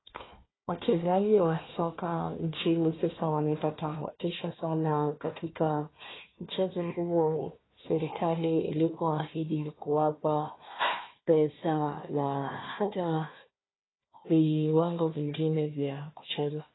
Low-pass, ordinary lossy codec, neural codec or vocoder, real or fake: 7.2 kHz; AAC, 16 kbps; codec, 16 kHz, 1 kbps, FunCodec, trained on Chinese and English, 50 frames a second; fake